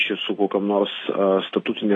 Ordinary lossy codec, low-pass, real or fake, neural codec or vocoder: AAC, 32 kbps; 10.8 kHz; real; none